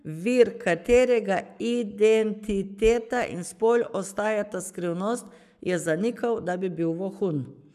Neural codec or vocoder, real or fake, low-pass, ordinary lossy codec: codec, 44.1 kHz, 7.8 kbps, Pupu-Codec; fake; 14.4 kHz; none